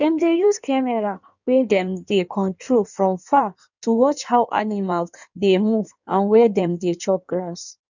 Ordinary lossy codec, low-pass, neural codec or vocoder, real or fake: none; 7.2 kHz; codec, 16 kHz in and 24 kHz out, 1.1 kbps, FireRedTTS-2 codec; fake